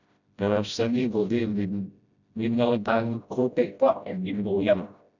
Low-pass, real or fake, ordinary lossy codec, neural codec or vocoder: 7.2 kHz; fake; none; codec, 16 kHz, 0.5 kbps, FreqCodec, smaller model